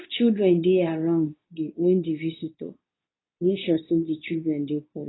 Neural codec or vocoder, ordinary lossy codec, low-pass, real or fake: codec, 24 kHz, 0.9 kbps, WavTokenizer, medium speech release version 2; AAC, 16 kbps; 7.2 kHz; fake